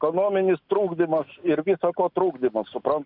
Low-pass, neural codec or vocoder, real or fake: 5.4 kHz; none; real